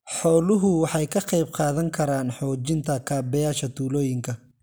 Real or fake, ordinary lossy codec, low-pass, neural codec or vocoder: real; none; none; none